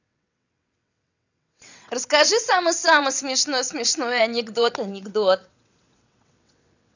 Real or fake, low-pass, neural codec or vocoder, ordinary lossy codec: fake; 7.2 kHz; vocoder, 22.05 kHz, 80 mel bands, WaveNeXt; none